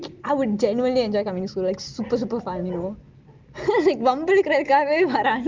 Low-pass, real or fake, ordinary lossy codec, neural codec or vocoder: 7.2 kHz; real; Opus, 32 kbps; none